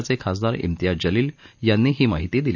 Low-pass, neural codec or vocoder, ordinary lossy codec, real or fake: 7.2 kHz; none; none; real